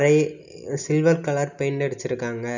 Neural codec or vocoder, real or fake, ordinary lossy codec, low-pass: none; real; none; 7.2 kHz